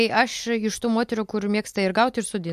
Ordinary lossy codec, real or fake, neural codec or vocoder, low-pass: MP3, 64 kbps; real; none; 14.4 kHz